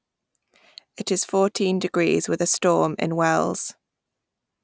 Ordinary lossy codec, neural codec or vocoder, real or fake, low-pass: none; none; real; none